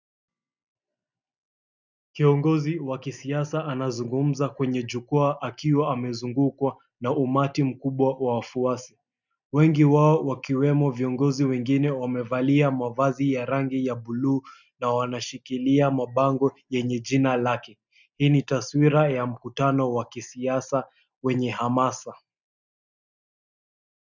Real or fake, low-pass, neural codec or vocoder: real; 7.2 kHz; none